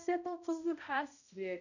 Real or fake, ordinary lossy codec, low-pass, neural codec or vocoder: fake; none; 7.2 kHz; codec, 16 kHz, 0.5 kbps, X-Codec, HuBERT features, trained on balanced general audio